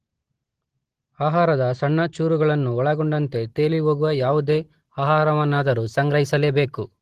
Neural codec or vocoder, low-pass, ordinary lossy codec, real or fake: none; 14.4 kHz; Opus, 16 kbps; real